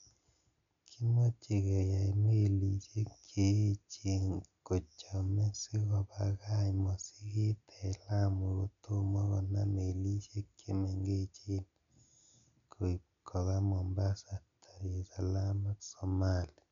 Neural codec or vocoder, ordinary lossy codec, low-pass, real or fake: none; none; 7.2 kHz; real